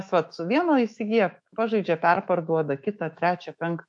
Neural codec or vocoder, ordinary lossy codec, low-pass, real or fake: autoencoder, 48 kHz, 128 numbers a frame, DAC-VAE, trained on Japanese speech; MP3, 48 kbps; 10.8 kHz; fake